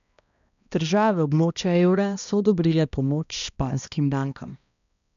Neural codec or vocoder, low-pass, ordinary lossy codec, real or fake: codec, 16 kHz, 1 kbps, X-Codec, HuBERT features, trained on balanced general audio; 7.2 kHz; none; fake